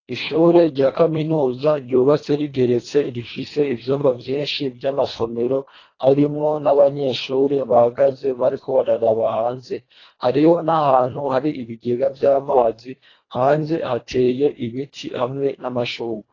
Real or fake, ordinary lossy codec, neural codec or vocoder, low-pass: fake; AAC, 32 kbps; codec, 24 kHz, 1.5 kbps, HILCodec; 7.2 kHz